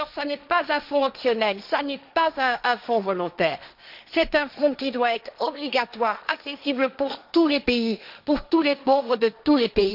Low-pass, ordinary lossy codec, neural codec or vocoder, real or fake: 5.4 kHz; none; codec, 16 kHz, 1.1 kbps, Voila-Tokenizer; fake